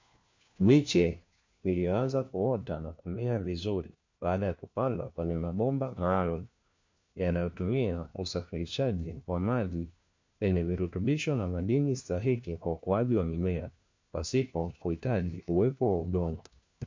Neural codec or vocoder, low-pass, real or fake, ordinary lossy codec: codec, 16 kHz, 1 kbps, FunCodec, trained on LibriTTS, 50 frames a second; 7.2 kHz; fake; MP3, 48 kbps